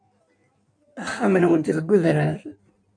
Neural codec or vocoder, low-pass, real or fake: codec, 16 kHz in and 24 kHz out, 1.1 kbps, FireRedTTS-2 codec; 9.9 kHz; fake